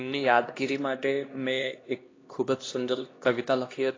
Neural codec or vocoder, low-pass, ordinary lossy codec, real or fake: codec, 16 kHz, 1 kbps, X-Codec, HuBERT features, trained on LibriSpeech; 7.2 kHz; AAC, 32 kbps; fake